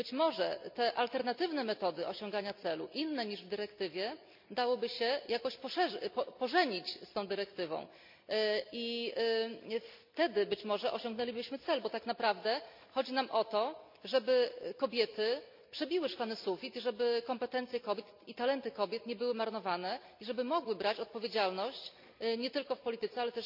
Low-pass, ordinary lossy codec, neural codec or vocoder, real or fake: 5.4 kHz; MP3, 48 kbps; none; real